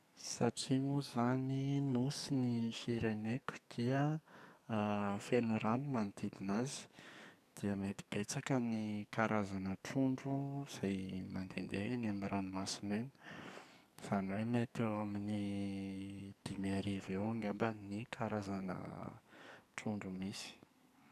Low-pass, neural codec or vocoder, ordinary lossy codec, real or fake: 14.4 kHz; codec, 44.1 kHz, 2.6 kbps, SNAC; none; fake